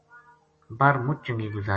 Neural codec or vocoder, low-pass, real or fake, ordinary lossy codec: none; 10.8 kHz; real; MP3, 32 kbps